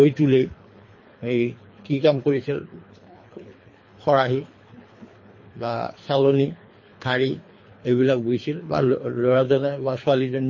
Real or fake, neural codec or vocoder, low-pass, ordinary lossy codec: fake; codec, 24 kHz, 3 kbps, HILCodec; 7.2 kHz; MP3, 32 kbps